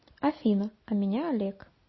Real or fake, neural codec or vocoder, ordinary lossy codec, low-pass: real; none; MP3, 24 kbps; 7.2 kHz